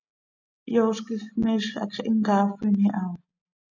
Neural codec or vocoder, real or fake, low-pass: none; real; 7.2 kHz